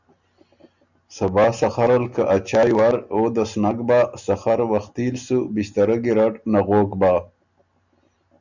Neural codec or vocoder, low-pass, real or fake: none; 7.2 kHz; real